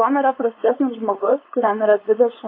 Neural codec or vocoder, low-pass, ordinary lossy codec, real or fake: codec, 16 kHz, 4.8 kbps, FACodec; 5.4 kHz; AAC, 32 kbps; fake